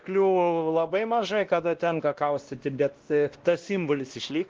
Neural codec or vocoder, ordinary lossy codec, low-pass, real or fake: codec, 16 kHz, 1 kbps, X-Codec, WavLM features, trained on Multilingual LibriSpeech; Opus, 16 kbps; 7.2 kHz; fake